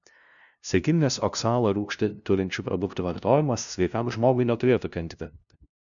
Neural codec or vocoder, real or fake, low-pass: codec, 16 kHz, 0.5 kbps, FunCodec, trained on LibriTTS, 25 frames a second; fake; 7.2 kHz